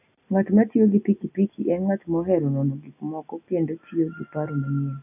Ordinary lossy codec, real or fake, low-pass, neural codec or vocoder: AAC, 32 kbps; real; 3.6 kHz; none